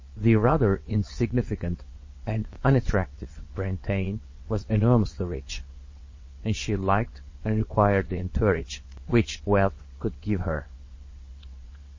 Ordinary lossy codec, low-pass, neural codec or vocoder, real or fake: MP3, 32 kbps; 7.2 kHz; vocoder, 22.05 kHz, 80 mel bands, WaveNeXt; fake